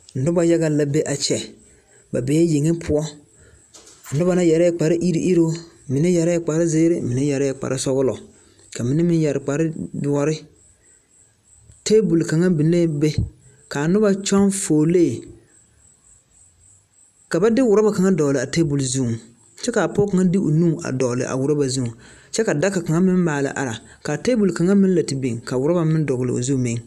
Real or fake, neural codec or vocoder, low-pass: real; none; 14.4 kHz